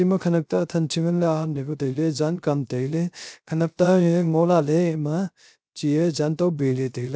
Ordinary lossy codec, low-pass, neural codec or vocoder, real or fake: none; none; codec, 16 kHz, 0.3 kbps, FocalCodec; fake